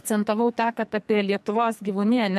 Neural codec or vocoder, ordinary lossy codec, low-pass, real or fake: codec, 44.1 kHz, 2.6 kbps, SNAC; MP3, 64 kbps; 14.4 kHz; fake